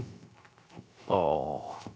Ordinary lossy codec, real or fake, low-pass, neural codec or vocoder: none; fake; none; codec, 16 kHz, 0.3 kbps, FocalCodec